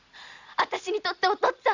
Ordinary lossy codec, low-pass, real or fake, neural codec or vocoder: Opus, 64 kbps; 7.2 kHz; real; none